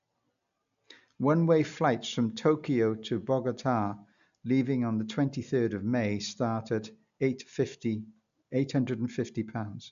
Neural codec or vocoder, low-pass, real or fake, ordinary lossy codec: none; 7.2 kHz; real; AAC, 96 kbps